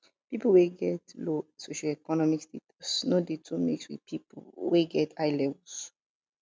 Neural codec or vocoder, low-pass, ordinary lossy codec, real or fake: none; none; none; real